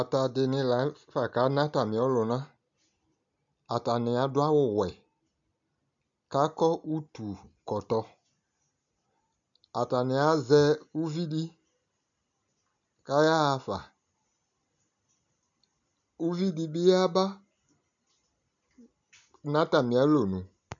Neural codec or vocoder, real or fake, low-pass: none; real; 7.2 kHz